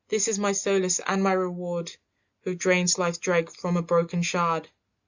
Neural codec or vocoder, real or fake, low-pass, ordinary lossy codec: none; real; 7.2 kHz; Opus, 64 kbps